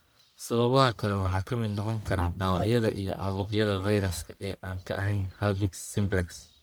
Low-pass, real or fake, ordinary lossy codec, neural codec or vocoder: none; fake; none; codec, 44.1 kHz, 1.7 kbps, Pupu-Codec